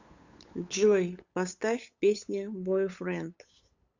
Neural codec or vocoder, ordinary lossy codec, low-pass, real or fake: codec, 16 kHz, 8 kbps, FunCodec, trained on LibriTTS, 25 frames a second; Opus, 64 kbps; 7.2 kHz; fake